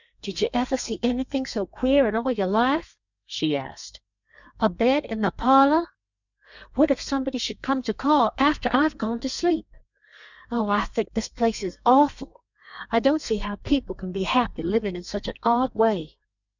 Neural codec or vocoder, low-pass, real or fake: codec, 32 kHz, 1.9 kbps, SNAC; 7.2 kHz; fake